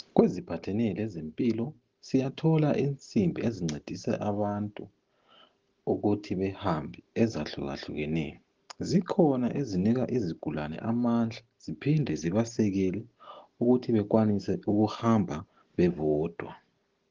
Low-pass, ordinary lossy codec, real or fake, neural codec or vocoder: 7.2 kHz; Opus, 16 kbps; real; none